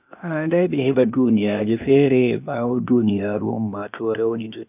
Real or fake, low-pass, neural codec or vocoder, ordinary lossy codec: fake; 3.6 kHz; codec, 16 kHz, 0.8 kbps, ZipCodec; none